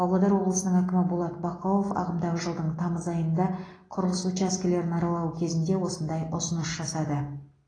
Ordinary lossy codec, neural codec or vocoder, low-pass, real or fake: AAC, 32 kbps; none; 9.9 kHz; real